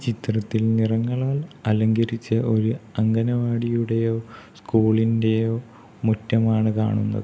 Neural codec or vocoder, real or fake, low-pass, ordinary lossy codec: none; real; none; none